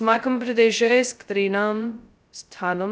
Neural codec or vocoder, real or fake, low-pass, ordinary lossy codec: codec, 16 kHz, 0.2 kbps, FocalCodec; fake; none; none